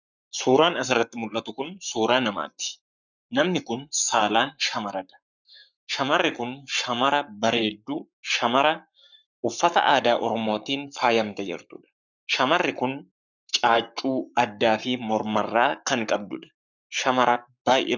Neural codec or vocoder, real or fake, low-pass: codec, 44.1 kHz, 7.8 kbps, Pupu-Codec; fake; 7.2 kHz